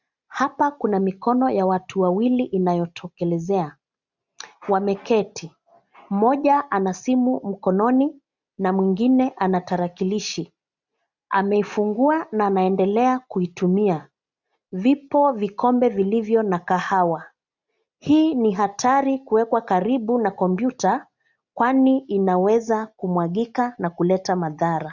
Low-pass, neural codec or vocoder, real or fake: 7.2 kHz; none; real